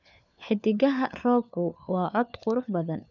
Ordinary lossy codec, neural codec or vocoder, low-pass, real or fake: none; codec, 16 kHz, 4 kbps, FunCodec, trained on LibriTTS, 50 frames a second; 7.2 kHz; fake